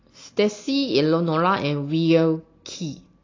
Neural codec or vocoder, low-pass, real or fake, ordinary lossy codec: none; 7.2 kHz; real; AAC, 32 kbps